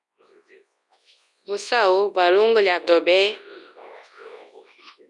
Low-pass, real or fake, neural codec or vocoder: 10.8 kHz; fake; codec, 24 kHz, 0.9 kbps, WavTokenizer, large speech release